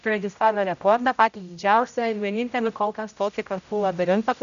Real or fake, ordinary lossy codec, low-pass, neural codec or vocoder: fake; AAC, 96 kbps; 7.2 kHz; codec, 16 kHz, 0.5 kbps, X-Codec, HuBERT features, trained on general audio